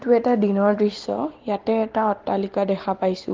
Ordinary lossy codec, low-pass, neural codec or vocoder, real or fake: Opus, 16 kbps; 7.2 kHz; none; real